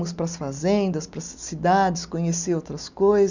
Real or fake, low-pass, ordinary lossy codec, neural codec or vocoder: real; 7.2 kHz; none; none